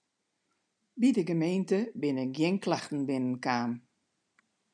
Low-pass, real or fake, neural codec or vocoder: 9.9 kHz; real; none